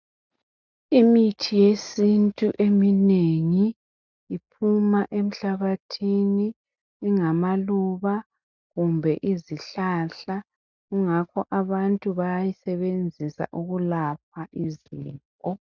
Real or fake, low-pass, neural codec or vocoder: real; 7.2 kHz; none